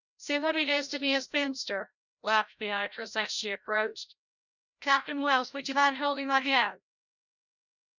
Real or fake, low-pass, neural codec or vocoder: fake; 7.2 kHz; codec, 16 kHz, 0.5 kbps, FreqCodec, larger model